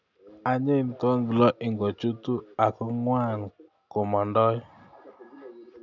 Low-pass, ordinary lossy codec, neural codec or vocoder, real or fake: 7.2 kHz; none; none; real